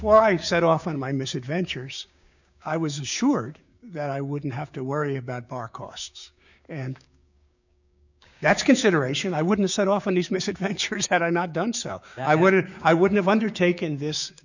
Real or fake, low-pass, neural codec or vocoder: fake; 7.2 kHz; codec, 16 kHz, 6 kbps, DAC